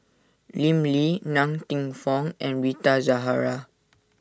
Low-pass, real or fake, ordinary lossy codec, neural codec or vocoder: none; real; none; none